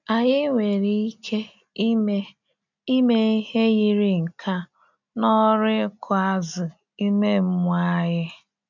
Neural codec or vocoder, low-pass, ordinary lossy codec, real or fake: none; 7.2 kHz; none; real